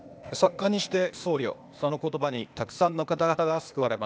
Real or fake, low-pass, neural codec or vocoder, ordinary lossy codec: fake; none; codec, 16 kHz, 0.8 kbps, ZipCodec; none